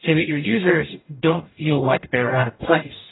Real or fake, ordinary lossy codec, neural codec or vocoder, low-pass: fake; AAC, 16 kbps; codec, 44.1 kHz, 0.9 kbps, DAC; 7.2 kHz